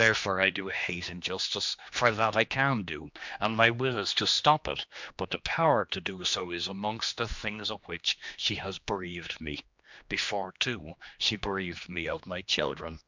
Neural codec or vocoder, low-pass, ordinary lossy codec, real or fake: codec, 16 kHz, 2 kbps, X-Codec, HuBERT features, trained on general audio; 7.2 kHz; MP3, 64 kbps; fake